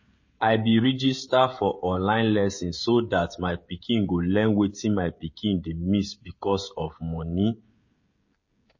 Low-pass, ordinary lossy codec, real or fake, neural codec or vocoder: 7.2 kHz; MP3, 32 kbps; fake; codec, 16 kHz, 16 kbps, FreqCodec, smaller model